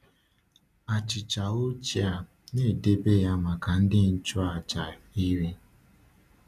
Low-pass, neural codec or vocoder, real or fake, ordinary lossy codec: 14.4 kHz; none; real; none